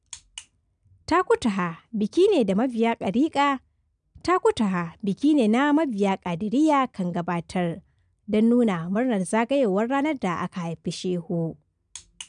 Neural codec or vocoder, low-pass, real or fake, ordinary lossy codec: none; 9.9 kHz; real; none